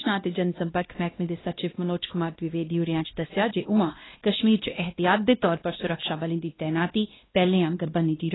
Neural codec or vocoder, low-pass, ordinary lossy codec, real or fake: codec, 16 kHz, 1 kbps, X-Codec, WavLM features, trained on Multilingual LibriSpeech; 7.2 kHz; AAC, 16 kbps; fake